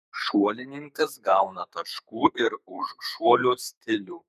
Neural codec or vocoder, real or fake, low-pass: codec, 32 kHz, 1.9 kbps, SNAC; fake; 14.4 kHz